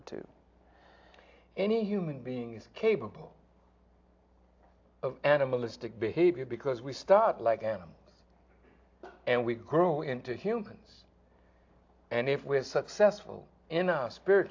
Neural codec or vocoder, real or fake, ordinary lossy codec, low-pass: none; real; AAC, 48 kbps; 7.2 kHz